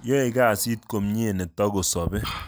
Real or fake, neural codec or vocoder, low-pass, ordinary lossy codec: real; none; none; none